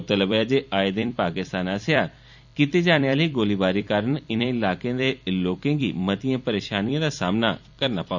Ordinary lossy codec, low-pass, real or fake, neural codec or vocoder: none; 7.2 kHz; fake; vocoder, 44.1 kHz, 128 mel bands every 256 samples, BigVGAN v2